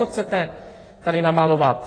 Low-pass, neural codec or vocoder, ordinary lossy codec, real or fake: 9.9 kHz; codec, 16 kHz in and 24 kHz out, 1.1 kbps, FireRedTTS-2 codec; AAC, 32 kbps; fake